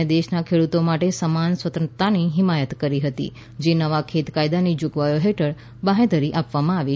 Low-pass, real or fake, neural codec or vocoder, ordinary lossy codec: none; real; none; none